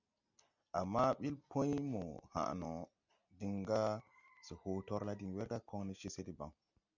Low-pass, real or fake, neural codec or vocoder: 7.2 kHz; real; none